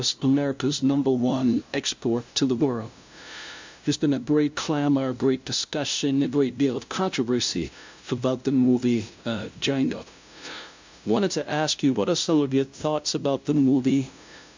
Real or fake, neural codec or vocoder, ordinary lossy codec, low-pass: fake; codec, 16 kHz, 0.5 kbps, FunCodec, trained on LibriTTS, 25 frames a second; MP3, 64 kbps; 7.2 kHz